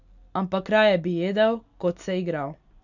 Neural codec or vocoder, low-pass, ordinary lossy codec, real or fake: none; 7.2 kHz; none; real